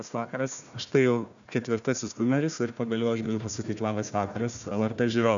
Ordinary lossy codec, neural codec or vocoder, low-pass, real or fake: MP3, 96 kbps; codec, 16 kHz, 1 kbps, FunCodec, trained on Chinese and English, 50 frames a second; 7.2 kHz; fake